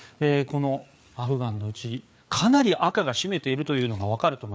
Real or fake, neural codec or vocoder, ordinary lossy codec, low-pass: fake; codec, 16 kHz, 4 kbps, FreqCodec, larger model; none; none